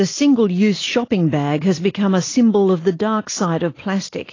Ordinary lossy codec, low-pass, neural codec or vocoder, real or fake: AAC, 32 kbps; 7.2 kHz; none; real